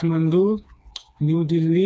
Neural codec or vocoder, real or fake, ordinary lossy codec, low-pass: codec, 16 kHz, 2 kbps, FreqCodec, smaller model; fake; none; none